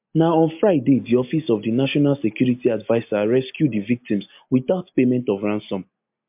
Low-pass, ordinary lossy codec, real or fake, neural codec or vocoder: 3.6 kHz; MP3, 32 kbps; real; none